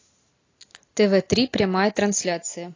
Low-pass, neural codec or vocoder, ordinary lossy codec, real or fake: 7.2 kHz; none; AAC, 32 kbps; real